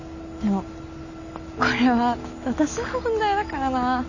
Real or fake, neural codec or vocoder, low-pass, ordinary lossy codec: real; none; 7.2 kHz; none